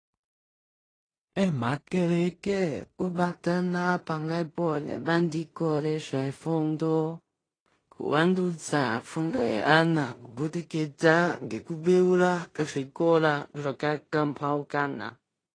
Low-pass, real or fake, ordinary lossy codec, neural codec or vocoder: 9.9 kHz; fake; AAC, 32 kbps; codec, 16 kHz in and 24 kHz out, 0.4 kbps, LongCat-Audio-Codec, two codebook decoder